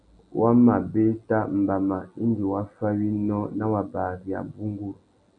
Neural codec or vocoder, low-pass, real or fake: none; 9.9 kHz; real